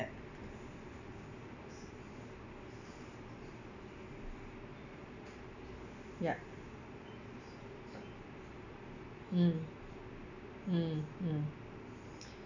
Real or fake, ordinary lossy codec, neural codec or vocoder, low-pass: real; none; none; 7.2 kHz